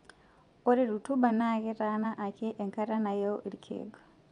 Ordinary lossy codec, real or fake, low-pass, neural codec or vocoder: none; fake; 10.8 kHz; vocoder, 24 kHz, 100 mel bands, Vocos